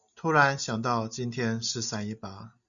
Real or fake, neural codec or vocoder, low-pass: real; none; 7.2 kHz